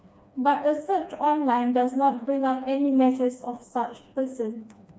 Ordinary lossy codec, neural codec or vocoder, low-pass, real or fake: none; codec, 16 kHz, 2 kbps, FreqCodec, smaller model; none; fake